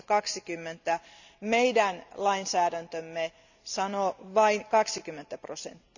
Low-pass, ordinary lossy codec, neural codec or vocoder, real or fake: 7.2 kHz; none; none; real